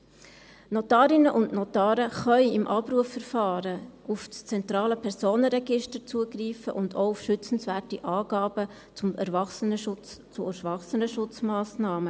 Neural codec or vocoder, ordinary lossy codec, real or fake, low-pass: none; none; real; none